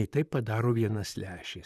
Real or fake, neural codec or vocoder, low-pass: fake; vocoder, 44.1 kHz, 128 mel bands, Pupu-Vocoder; 14.4 kHz